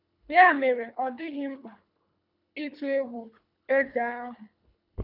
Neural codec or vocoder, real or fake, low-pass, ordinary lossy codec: codec, 24 kHz, 3 kbps, HILCodec; fake; 5.4 kHz; none